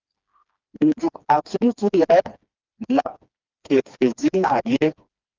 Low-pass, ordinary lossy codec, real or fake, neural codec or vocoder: 7.2 kHz; Opus, 24 kbps; fake; codec, 16 kHz, 2 kbps, FreqCodec, smaller model